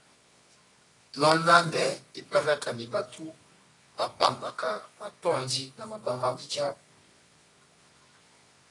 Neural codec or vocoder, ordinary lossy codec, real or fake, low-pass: codec, 24 kHz, 0.9 kbps, WavTokenizer, medium music audio release; AAC, 32 kbps; fake; 10.8 kHz